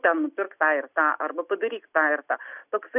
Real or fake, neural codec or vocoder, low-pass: real; none; 3.6 kHz